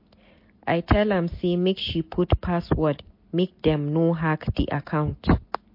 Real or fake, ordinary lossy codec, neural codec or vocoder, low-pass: real; MP3, 32 kbps; none; 5.4 kHz